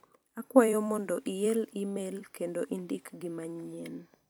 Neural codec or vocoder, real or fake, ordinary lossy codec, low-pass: vocoder, 44.1 kHz, 128 mel bands every 256 samples, BigVGAN v2; fake; none; none